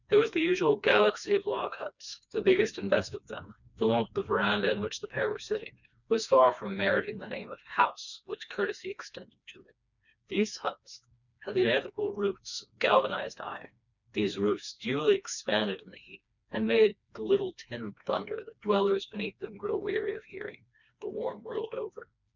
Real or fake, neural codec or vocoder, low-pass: fake; codec, 16 kHz, 2 kbps, FreqCodec, smaller model; 7.2 kHz